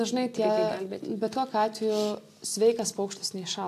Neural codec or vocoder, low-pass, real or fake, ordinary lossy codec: none; 14.4 kHz; real; AAC, 64 kbps